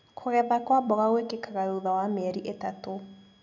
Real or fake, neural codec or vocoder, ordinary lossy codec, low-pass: real; none; none; 7.2 kHz